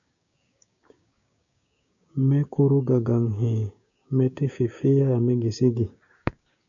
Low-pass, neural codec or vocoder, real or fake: 7.2 kHz; codec, 16 kHz, 6 kbps, DAC; fake